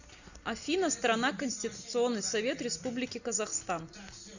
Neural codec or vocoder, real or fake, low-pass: none; real; 7.2 kHz